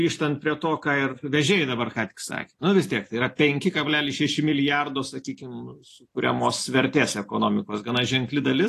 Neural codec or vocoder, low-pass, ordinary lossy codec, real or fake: none; 14.4 kHz; AAC, 48 kbps; real